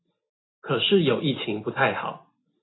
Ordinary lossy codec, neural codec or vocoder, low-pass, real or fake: AAC, 16 kbps; none; 7.2 kHz; real